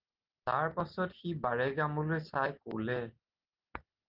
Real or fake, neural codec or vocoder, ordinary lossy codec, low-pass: real; none; Opus, 16 kbps; 5.4 kHz